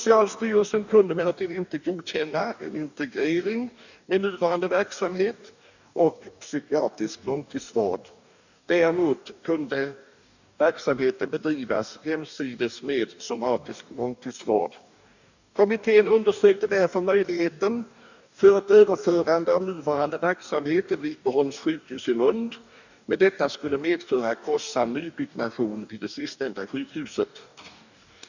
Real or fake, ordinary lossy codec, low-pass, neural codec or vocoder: fake; none; 7.2 kHz; codec, 44.1 kHz, 2.6 kbps, DAC